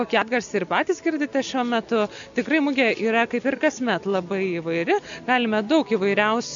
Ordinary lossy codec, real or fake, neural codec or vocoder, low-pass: MP3, 96 kbps; real; none; 7.2 kHz